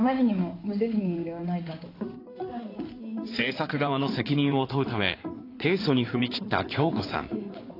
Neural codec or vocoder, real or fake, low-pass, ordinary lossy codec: codec, 16 kHz in and 24 kHz out, 2.2 kbps, FireRedTTS-2 codec; fake; 5.4 kHz; AAC, 32 kbps